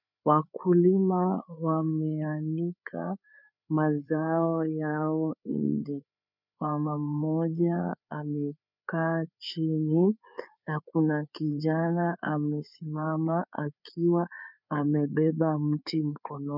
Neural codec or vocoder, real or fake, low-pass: codec, 16 kHz, 4 kbps, FreqCodec, larger model; fake; 5.4 kHz